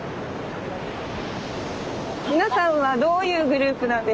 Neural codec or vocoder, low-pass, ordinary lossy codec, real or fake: none; none; none; real